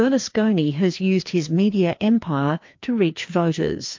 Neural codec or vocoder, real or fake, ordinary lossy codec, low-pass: codec, 16 kHz, 2 kbps, FreqCodec, larger model; fake; MP3, 48 kbps; 7.2 kHz